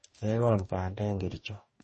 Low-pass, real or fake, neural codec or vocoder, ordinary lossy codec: 10.8 kHz; fake; codec, 44.1 kHz, 2.6 kbps, DAC; MP3, 32 kbps